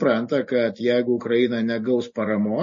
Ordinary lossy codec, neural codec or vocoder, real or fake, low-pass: MP3, 32 kbps; none; real; 7.2 kHz